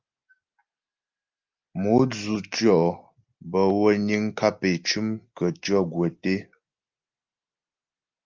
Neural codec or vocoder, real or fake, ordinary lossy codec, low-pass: none; real; Opus, 24 kbps; 7.2 kHz